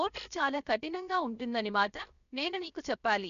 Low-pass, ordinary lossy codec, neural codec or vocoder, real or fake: 7.2 kHz; none; codec, 16 kHz, 0.7 kbps, FocalCodec; fake